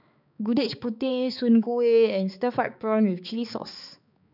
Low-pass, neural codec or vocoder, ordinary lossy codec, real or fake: 5.4 kHz; codec, 16 kHz, 4 kbps, X-Codec, HuBERT features, trained on balanced general audio; none; fake